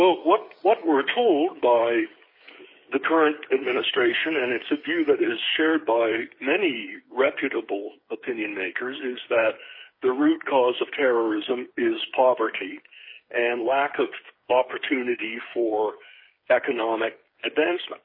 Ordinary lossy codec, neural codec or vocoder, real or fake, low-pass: MP3, 24 kbps; codec, 16 kHz, 8 kbps, FreqCodec, smaller model; fake; 5.4 kHz